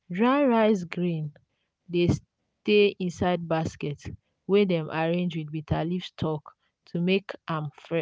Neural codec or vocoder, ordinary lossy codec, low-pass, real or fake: none; none; none; real